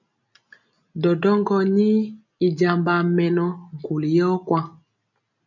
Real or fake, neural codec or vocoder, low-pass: real; none; 7.2 kHz